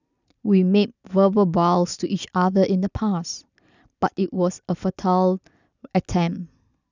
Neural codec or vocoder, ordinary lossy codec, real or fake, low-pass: none; none; real; 7.2 kHz